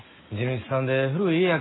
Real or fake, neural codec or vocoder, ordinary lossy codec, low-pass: real; none; AAC, 16 kbps; 7.2 kHz